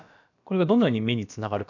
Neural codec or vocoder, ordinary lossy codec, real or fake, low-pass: codec, 16 kHz, about 1 kbps, DyCAST, with the encoder's durations; none; fake; 7.2 kHz